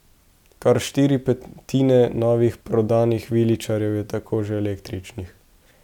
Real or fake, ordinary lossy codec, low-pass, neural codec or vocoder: real; none; 19.8 kHz; none